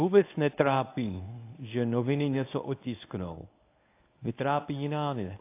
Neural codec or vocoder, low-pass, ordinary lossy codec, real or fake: codec, 24 kHz, 0.9 kbps, WavTokenizer, medium speech release version 1; 3.6 kHz; AAC, 24 kbps; fake